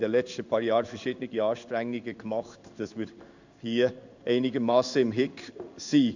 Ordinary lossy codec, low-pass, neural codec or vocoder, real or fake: none; 7.2 kHz; codec, 16 kHz in and 24 kHz out, 1 kbps, XY-Tokenizer; fake